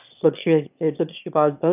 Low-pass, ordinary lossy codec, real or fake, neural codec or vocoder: 3.6 kHz; none; fake; autoencoder, 22.05 kHz, a latent of 192 numbers a frame, VITS, trained on one speaker